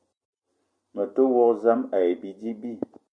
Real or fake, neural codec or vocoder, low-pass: real; none; 9.9 kHz